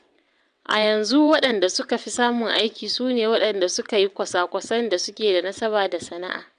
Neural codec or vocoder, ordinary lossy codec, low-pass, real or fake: vocoder, 22.05 kHz, 80 mel bands, WaveNeXt; none; 9.9 kHz; fake